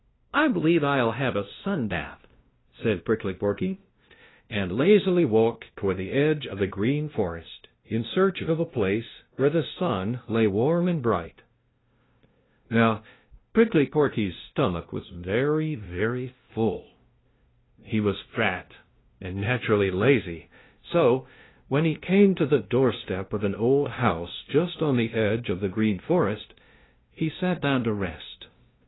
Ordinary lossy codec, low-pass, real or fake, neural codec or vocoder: AAC, 16 kbps; 7.2 kHz; fake; codec, 16 kHz, 0.5 kbps, FunCodec, trained on LibriTTS, 25 frames a second